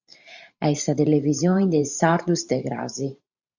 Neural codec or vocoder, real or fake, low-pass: vocoder, 44.1 kHz, 128 mel bands every 512 samples, BigVGAN v2; fake; 7.2 kHz